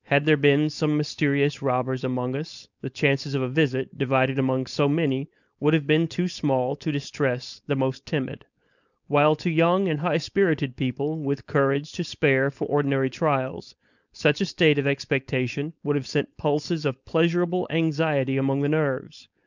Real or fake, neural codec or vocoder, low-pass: fake; codec, 16 kHz, 4.8 kbps, FACodec; 7.2 kHz